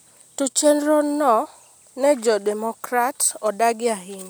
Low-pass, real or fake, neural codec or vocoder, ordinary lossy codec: none; real; none; none